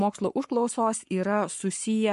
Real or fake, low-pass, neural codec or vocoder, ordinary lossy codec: fake; 14.4 kHz; codec, 44.1 kHz, 7.8 kbps, Pupu-Codec; MP3, 48 kbps